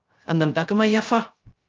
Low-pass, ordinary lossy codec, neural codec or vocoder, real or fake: 7.2 kHz; Opus, 24 kbps; codec, 16 kHz, 0.3 kbps, FocalCodec; fake